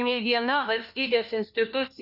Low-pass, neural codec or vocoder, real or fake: 5.4 kHz; codec, 16 kHz, 1 kbps, FunCodec, trained on LibriTTS, 50 frames a second; fake